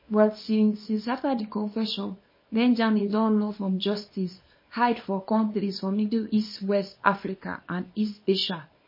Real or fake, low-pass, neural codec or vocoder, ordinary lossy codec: fake; 5.4 kHz; codec, 24 kHz, 0.9 kbps, WavTokenizer, small release; MP3, 24 kbps